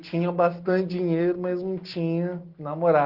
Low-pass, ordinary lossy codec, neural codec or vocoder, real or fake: 5.4 kHz; Opus, 16 kbps; none; real